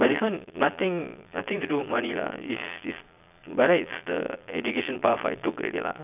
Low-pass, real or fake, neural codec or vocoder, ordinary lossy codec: 3.6 kHz; fake; vocoder, 22.05 kHz, 80 mel bands, Vocos; none